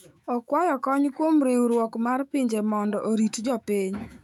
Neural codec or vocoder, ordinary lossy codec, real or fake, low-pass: autoencoder, 48 kHz, 128 numbers a frame, DAC-VAE, trained on Japanese speech; none; fake; 19.8 kHz